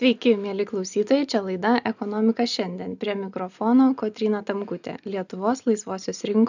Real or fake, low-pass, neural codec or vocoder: real; 7.2 kHz; none